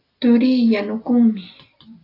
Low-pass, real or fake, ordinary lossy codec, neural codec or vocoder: 5.4 kHz; real; AAC, 32 kbps; none